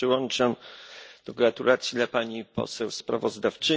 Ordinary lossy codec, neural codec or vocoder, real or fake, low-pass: none; none; real; none